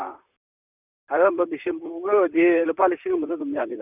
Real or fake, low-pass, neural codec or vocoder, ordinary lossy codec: fake; 3.6 kHz; vocoder, 44.1 kHz, 128 mel bands, Pupu-Vocoder; none